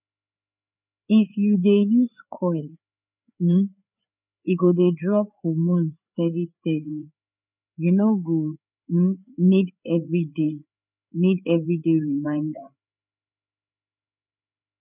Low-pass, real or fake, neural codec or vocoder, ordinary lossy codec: 3.6 kHz; fake; codec, 16 kHz, 4 kbps, FreqCodec, larger model; none